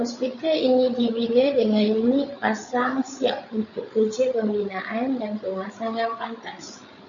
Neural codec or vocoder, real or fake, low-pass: codec, 16 kHz, 8 kbps, FreqCodec, larger model; fake; 7.2 kHz